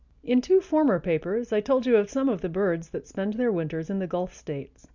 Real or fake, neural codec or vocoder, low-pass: real; none; 7.2 kHz